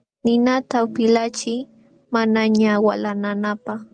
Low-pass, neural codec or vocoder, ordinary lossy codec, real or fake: 9.9 kHz; none; Opus, 32 kbps; real